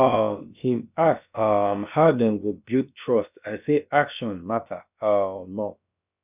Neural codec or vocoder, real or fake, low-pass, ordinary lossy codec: codec, 16 kHz, about 1 kbps, DyCAST, with the encoder's durations; fake; 3.6 kHz; none